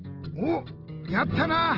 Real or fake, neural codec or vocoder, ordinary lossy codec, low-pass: real; none; Opus, 16 kbps; 5.4 kHz